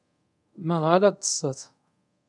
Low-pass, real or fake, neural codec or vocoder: 10.8 kHz; fake; codec, 24 kHz, 0.5 kbps, DualCodec